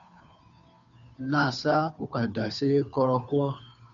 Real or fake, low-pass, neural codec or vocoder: fake; 7.2 kHz; codec, 16 kHz, 2 kbps, FunCodec, trained on Chinese and English, 25 frames a second